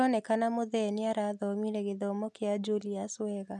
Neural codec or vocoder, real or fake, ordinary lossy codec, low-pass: none; real; none; none